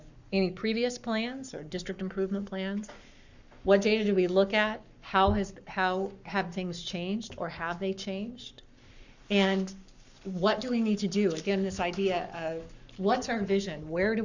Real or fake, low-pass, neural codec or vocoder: fake; 7.2 kHz; codec, 44.1 kHz, 7.8 kbps, Pupu-Codec